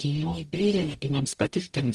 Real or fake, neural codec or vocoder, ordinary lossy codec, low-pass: fake; codec, 44.1 kHz, 0.9 kbps, DAC; Opus, 32 kbps; 10.8 kHz